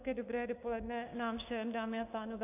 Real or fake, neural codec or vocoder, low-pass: fake; codec, 16 kHz, 6 kbps, DAC; 3.6 kHz